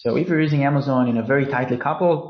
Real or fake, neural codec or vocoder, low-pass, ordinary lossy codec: real; none; 7.2 kHz; MP3, 32 kbps